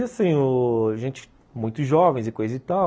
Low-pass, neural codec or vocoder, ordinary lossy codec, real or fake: none; none; none; real